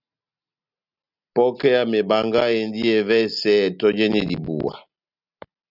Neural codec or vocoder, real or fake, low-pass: none; real; 5.4 kHz